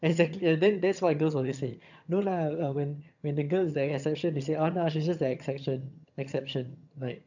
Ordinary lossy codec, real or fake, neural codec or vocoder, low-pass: none; fake; vocoder, 22.05 kHz, 80 mel bands, HiFi-GAN; 7.2 kHz